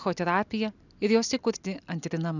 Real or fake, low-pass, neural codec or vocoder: real; 7.2 kHz; none